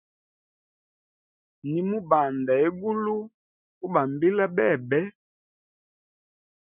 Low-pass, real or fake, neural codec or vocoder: 3.6 kHz; real; none